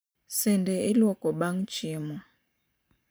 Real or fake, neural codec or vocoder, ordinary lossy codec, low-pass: fake; vocoder, 44.1 kHz, 128 mel bands every 512 samples, BigVGAN v2; none; none